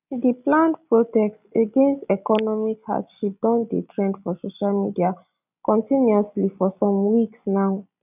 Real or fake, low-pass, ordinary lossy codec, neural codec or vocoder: real; 3.6 kHz; none; none